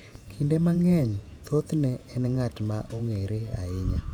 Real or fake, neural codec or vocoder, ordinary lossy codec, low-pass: fake; vocoder, 48 kHz, 128 mel bands, Vocos; none; 19.8 kHz